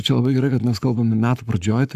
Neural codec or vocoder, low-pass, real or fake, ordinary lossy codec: codec, 44.1 kHz, 7.8 kbps, Pupu-Codec; 14.4 kHz; fake; Opus, 64 kbps